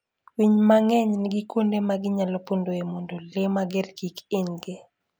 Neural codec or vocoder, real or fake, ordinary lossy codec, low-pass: none; real; none; none